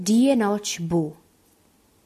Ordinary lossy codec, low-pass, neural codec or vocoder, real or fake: AAC, 96 kbps; 14.4 kHz; none; real